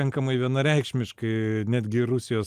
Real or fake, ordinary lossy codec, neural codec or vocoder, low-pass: real; Opus, 32 kbps; none; 14.4 kHz